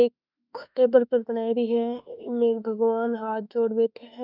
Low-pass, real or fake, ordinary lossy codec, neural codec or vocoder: 5.4 kHz; fake; none; autoencoder, 48 kHz, 32 numbers a frame, DAC-VAE, trained on Japanese speech